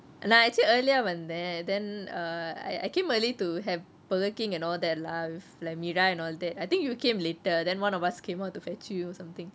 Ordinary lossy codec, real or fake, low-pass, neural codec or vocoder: none; real; none; none